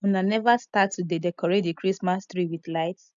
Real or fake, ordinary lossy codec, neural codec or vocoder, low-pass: real; MP3, 96 kbps; none; 7.2 kHz